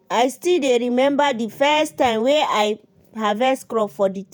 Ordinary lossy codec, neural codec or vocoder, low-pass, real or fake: none; vocoder, 48 kHz, 128 mel bands, Vocos; none; fake